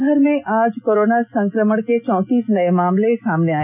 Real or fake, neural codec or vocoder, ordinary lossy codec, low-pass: real; none; none; 3.6 kHz